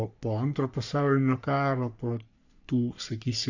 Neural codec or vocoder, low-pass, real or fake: codec, 44.1 kHz, 3.4 kbps, Pupu-Codec; 7.2 kHz; fake